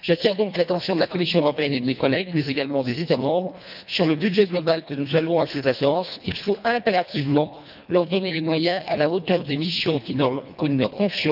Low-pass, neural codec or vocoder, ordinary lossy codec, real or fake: 5.4 kHz; codec, 24 kHz, 1.5 kbps, HILCodec; none; fake